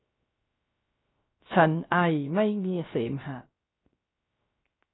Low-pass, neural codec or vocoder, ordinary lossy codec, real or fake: 7.2 kHz; codec, 16 kHz, 0.3 kbps, FocalCodec; AAC, 16 kbps; fake